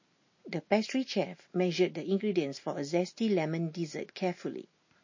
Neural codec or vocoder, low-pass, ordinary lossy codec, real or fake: none; 7.2 kHz; MP3, 32 kbps; real